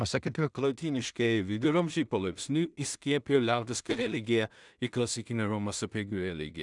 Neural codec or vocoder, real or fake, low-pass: codec, 16 kHz in and 24 kHz out, 0.4 kbps, LongCat-Audio-Codec, two codebook decoder; fake; 10.8 kHz